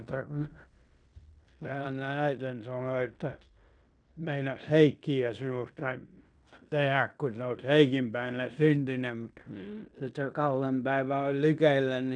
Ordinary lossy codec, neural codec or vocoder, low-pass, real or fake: none; codec, 16 kHz in and 24 kHz out, 0.9 kbps, LongCat-Audio-Codec, fine tuned four codebook decoder; 9.9 kHz; fake